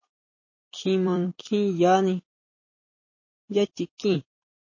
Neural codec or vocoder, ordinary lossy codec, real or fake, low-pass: vocoder, 44.1 kHz, 128 mel bands every 256 samples, BigVGAN v2; MP3, 32 kbps; fake; 7.2 kHz